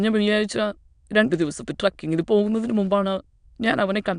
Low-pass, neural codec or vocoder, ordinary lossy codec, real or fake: 9.9 kHz; autoencoder, 22.05 kHz, a latent of 192 numbers a frame, VITS, trained on many speakers; none; fake